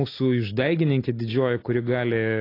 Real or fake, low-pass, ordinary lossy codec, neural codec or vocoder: real; 5.4 kHz; AAC, 32 kbps; none